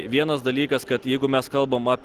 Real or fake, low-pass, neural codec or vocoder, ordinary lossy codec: real; 14.4 kHz; none; Opus, 16 kbps